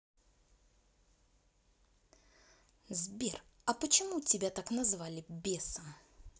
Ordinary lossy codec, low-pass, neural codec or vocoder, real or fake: none; none; none; real